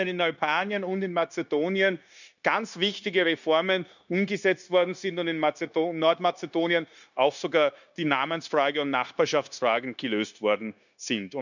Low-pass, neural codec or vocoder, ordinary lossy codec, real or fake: 7.2 kHz; codec, 16 kHz, 0.9 kbps, LongCat-Audio-Codec; none; fake